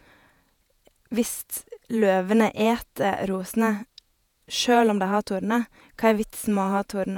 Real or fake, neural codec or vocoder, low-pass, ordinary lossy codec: fake; vocoder, 48 kHz, 128 mel bands, Vocos; 19.8 kHz; none